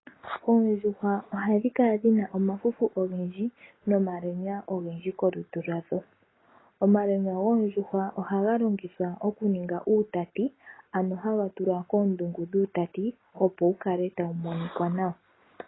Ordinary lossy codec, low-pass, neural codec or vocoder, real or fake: AAC, 16 kbps; 7.2 kHz; none; real